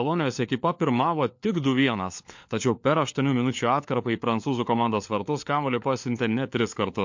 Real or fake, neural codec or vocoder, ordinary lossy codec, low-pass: fake; codec, 16 kHz, 4 kbps, FunCodec, trained on LibriTTS, 50 frames a second; MP3, 48 kbps; 7.2 kHz